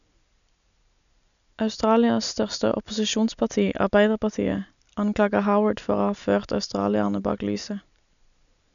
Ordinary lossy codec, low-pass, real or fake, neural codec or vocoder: none; 7.2 kHz; real; none